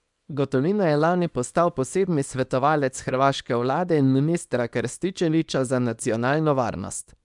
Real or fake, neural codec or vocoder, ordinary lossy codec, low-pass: fake; codec, 24 kHz, 0.9 kbps, WavTokenizer, small release; none; 10.8 kHz